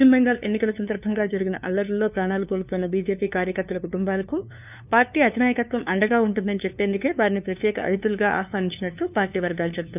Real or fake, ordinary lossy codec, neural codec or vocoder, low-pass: fake; none; codec, 16 kHz, 2 kbps, FunCodec, trained on LibriTTS, 25 frames a second; 3.6 kHz